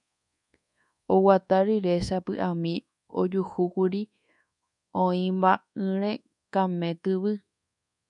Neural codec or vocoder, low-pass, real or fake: codec, 24 kHz, 1.2 kbps, DualCodec; 10.8 kHz; fake